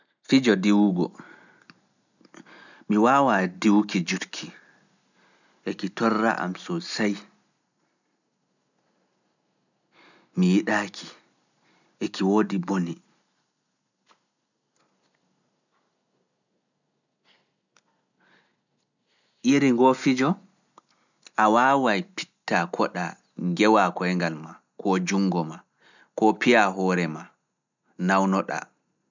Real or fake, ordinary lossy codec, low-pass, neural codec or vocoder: real; none; 7.2 kHz; none